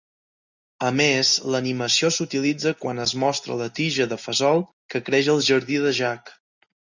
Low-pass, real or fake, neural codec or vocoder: 7.2 kHz; real; none